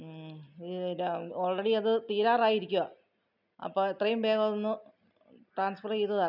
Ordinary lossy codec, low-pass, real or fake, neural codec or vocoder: none; 5.4 kHz; real; none